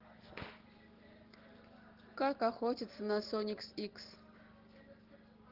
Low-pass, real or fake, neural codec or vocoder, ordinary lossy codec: 5.4 kHz; real; none; Opus, 16 kbps